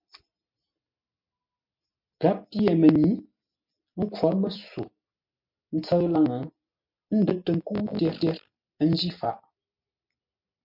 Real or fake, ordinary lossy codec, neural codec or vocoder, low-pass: real; MP3, 48 kbps; none; 5.4 kHz